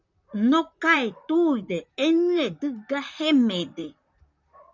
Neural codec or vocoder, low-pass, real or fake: vocoder, 44.1 kHz, 128 mel bands, Pupu-Vocoder; 7.2 kHz; fake